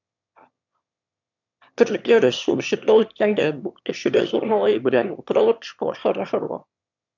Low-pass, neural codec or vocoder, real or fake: 7.2 kHz; autoencoder, 22.05 kHz, a latent of 192 numbers a frame, VITS, trained on one speaker; fake